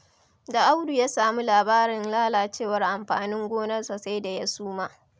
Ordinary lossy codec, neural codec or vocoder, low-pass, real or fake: none; none; none; real